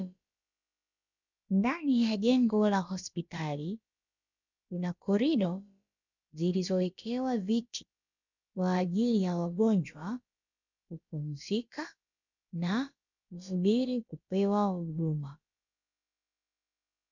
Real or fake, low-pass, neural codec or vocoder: fake; 7.2 kHz; codec, 16 kHz, about 1 kbps, DyCAST, with the encoder's durations